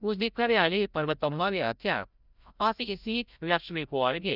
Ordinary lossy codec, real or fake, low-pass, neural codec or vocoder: none; fake; 5.4 kHz; codec, 16 kHz, 0.5 kbps, FreqCodec, larger model